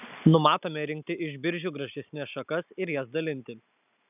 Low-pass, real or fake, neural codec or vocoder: 3.6 kHz; real; none